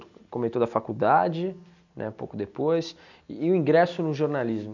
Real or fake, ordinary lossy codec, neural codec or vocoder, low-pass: real; none; none; 7.2 kHz